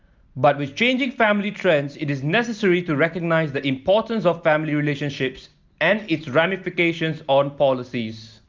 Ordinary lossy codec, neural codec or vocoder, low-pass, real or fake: Opus, 24 kbps; none; 7.2 kHz; real